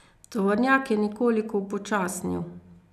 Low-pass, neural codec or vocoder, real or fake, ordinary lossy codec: 14.4 kHz; none; real; none